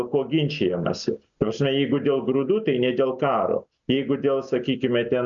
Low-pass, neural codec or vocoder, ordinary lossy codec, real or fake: 7.2 kHz; none; MP3, 64 kbps; real